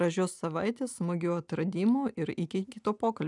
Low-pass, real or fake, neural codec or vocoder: 10.8 kHz; real; none